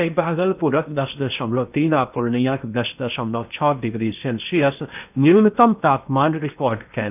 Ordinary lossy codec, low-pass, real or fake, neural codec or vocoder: none; 3.6 kHz; fake; codec, 16 kHz in and 24 kHz out, 0.8 kbps, FocalCodec, streaming, 65536 codes